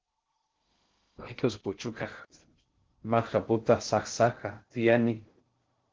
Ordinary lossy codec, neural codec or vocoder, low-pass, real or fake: Opus, 16 kbps; codec, 16 kHz in and 24 kHz out, 0.6 kbps, FocalCodec, streaming, 2048 codes; 7.2 kHz; fake